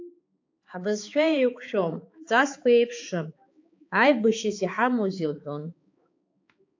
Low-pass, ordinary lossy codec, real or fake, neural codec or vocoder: 7.2 kHz; AAC, 48 kbps; fake; codec, 16 kHz, 4 kbps, X-Codec, HuBERT features, trained on balanced general audio